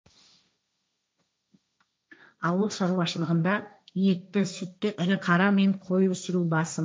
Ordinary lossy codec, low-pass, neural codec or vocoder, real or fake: none; none; codec, 16 kHz, 1.1 kbps, Voila-Tokenizer; fake